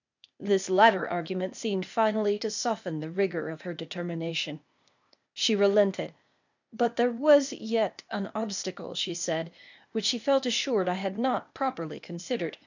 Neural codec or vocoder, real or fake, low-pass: codec, 16 kHz, 0.8 kbps, ZipCodec; fake; 7.2 kHz